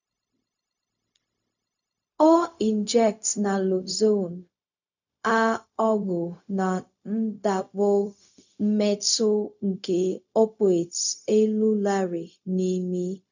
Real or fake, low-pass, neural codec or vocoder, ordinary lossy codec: fake; 7.2 kHz; codec, 16 kHz, 0.4 kbps, LongCat-Audio-Codec; none